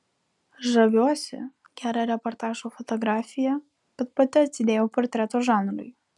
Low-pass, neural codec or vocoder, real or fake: 10.8 kHz; none; real